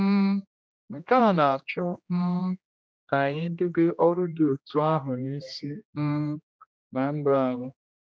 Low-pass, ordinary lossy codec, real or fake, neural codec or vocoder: none; none; fake; codec, 16 kHz, 1 kbps, X-Codec, HuBERT features, trained on general audio